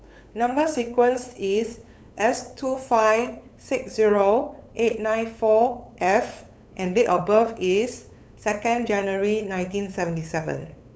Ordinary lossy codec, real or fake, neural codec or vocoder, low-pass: none; fake; codec, 16 kHz, 8 kbps, FunCodec, trained on LibriTTS, 25 frames a second; none